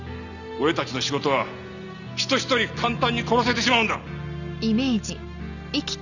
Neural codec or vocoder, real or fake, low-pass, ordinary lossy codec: none; real; 7.2 kHz; none